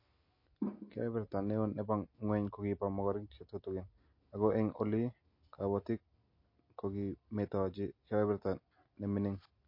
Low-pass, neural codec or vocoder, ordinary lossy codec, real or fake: 5.4 kHz; none; MP3, 32 kbps; real